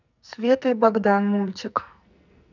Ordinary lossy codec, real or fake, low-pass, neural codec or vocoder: none; fake; 7.2 kHz; codec, 44.1 kHz, 2.6 kbps, SNAC